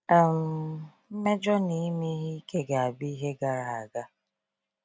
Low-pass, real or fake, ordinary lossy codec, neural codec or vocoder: none; real; none; none